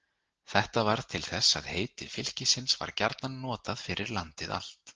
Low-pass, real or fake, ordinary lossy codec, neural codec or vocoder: 7.2 kHz; real; Opus, 16 kbps; none